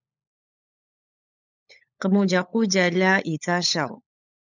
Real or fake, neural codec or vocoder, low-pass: fake; codec, 16 kHz, 16 kbps, FunCodec, trained on LibriTTS, 50 frames a second; 7.2 kHz